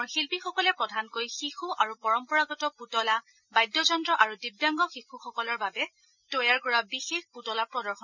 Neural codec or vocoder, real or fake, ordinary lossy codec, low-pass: none; real; none; 7.2 kHz